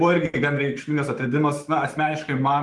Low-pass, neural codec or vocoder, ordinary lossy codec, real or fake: 10.8 kHz; autoencoder, 48 kHz, 128 numbers a frame, DAC-VAE, trained on Japanese speech; Opus, 24 kbps; fake